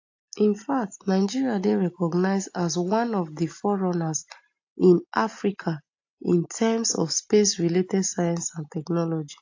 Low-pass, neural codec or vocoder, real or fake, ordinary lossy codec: 7.2 kHz; none; real; AAC, 48 kbps